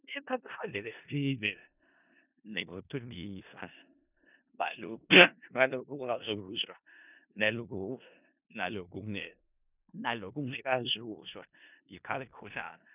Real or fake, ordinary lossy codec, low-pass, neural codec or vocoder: fake; none; 3.6 kHz; codec, 16 kHz in and 24 kHz out, 0.4 kbps, LongCat-Audio-Codec, four codebook decoder